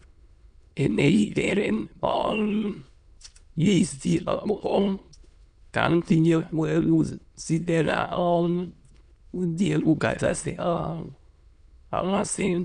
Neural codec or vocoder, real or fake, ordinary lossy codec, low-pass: autoencoder, 22.05 kHz, a latent of 192 numbers a frame, VITS, trained on many speakers; fake; none; 9.9 kHz